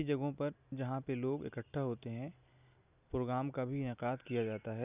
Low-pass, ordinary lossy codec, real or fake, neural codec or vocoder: 3.6 kHz; none; real; none